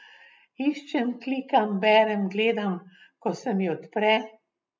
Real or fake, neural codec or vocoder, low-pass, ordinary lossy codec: real; none; none; none